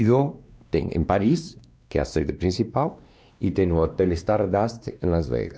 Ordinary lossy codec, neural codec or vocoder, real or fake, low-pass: none; codec, 16 kHz, 2 kbps, X-Codec, WavLM features, trained on Multilingual LibriSpeech; fake; none